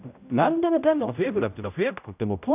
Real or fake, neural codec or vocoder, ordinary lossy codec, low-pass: fake; codec, 16 kHz, 0.5 kbps, X-Codec, HuBERT features, trained on general audio; none; 3.6 kHz